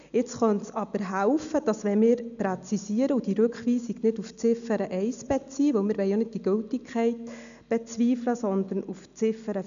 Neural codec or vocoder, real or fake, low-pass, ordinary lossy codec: none; real; 7.2 kHz; none